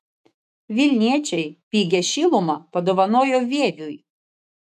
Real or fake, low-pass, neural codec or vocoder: fake; 14.4 kHz; autoencoder, 48 kHz, 128 numbers a frame, DAC-VAE, trained on Japanese speech